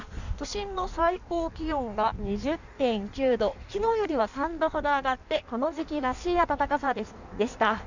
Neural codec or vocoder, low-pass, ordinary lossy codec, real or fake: codec, 16 kHz in and 24 kHz out, 1.1 kbps, FireRedTTS-2 codec; 7.2 kHz; none; fake